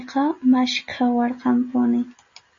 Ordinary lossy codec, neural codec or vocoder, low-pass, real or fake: MP3, 32 kbps; none; 7.2 kHz; real